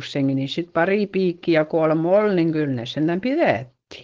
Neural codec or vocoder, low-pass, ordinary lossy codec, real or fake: codec, 16 kHz, 4.8 kbps, FACodec; 7.2 kHz; Opus, 16 kbps; fake